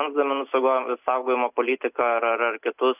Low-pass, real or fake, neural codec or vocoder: 3.6 kHz; real; none